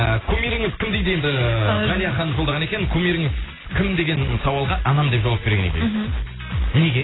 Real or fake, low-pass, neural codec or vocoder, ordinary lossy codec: real; 7.2 kHz; none; AAC, 16 kbps